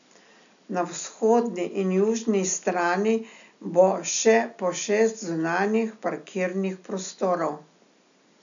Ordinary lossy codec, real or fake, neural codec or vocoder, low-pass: none; real; none; 7.2 kHz